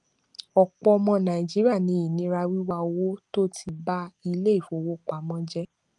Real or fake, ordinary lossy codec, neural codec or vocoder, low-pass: real; Opus, 32 kbps; none; 10.8 kHz